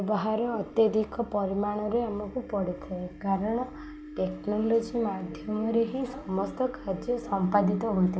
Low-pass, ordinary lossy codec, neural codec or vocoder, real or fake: none; none; none; real